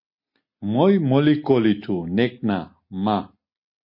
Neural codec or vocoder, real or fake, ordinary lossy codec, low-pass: none; real; MP3, 32 kbps; 5.4 kHz